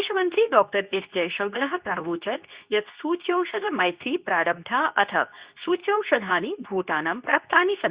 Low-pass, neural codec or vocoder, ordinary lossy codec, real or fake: 3.6 kHz; codec, 24 kHz, 0.9 kbps, WavTokenizer, medium speech release version 2; Opus, 64 kbps; fake